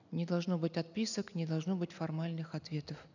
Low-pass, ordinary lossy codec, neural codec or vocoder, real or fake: 7.2 kHz; none; none; real